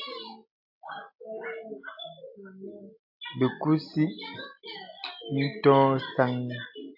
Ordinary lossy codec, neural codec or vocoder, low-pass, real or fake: AAC, 48 kbps; none; 5.4 kHz; real